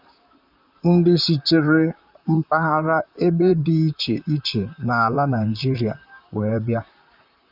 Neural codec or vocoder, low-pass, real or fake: vocoder, 44.1 kHz, 128 mel bands, Pupu-Vocoder; 5.4 kHz; fake